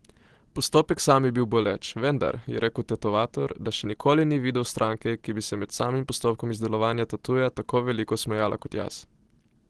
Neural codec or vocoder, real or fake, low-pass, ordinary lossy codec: none; real; 10.8 kHz; Opus, 16 kbps